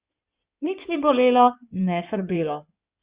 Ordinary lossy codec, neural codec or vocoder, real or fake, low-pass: Opus, 64 kbps; codec, 16 kHz in and 24 kHz out, 2.2 kbps, FireRedTTS-2 codec; fake; 3.6 kHz